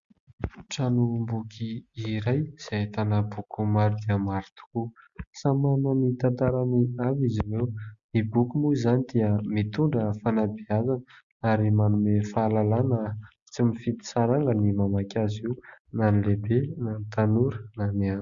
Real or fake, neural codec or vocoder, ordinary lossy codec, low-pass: real; none; Opus, 64 kbps; 7.2 kHz